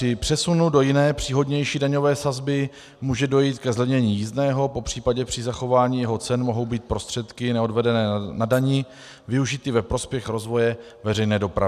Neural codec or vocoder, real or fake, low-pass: none; real; 14.4 kHz